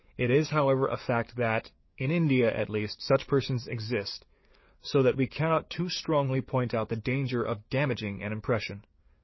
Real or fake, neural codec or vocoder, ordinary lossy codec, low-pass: fake; codec, 44.1 kHz, 7.8 kbps, DAC; MP3, 24 kbps; 7.2 kHz